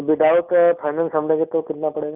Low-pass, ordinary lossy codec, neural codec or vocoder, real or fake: 3.6 kHz; none; none; real